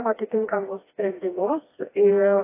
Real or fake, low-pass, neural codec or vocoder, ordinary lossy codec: fake; 3.6 kHz; codec, 16 kHz, 1 kbps, FreqCodec, smaller model; AAC, 24 kbps